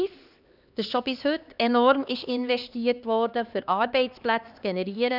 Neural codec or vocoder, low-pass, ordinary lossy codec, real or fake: codec, 16 kHz, 2 kbps, X-Codec, HuBERT features, trained on LibriSpeech; 5.4 kHz; none; fake